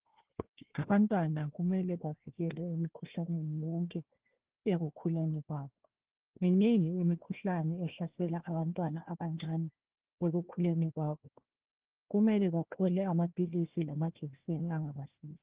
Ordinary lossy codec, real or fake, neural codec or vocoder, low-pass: Opus, 16 kbps; fake; codec, 16 kHz, 1 kbps, FunCodec, trained on Chinese and English, 50 frames a second; 3.6 kHz